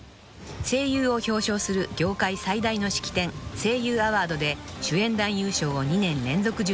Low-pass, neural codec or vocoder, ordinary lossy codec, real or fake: none; none; none; real